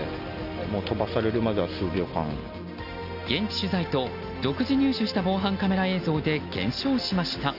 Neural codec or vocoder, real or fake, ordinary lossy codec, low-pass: none; real; none; 5.4 kHz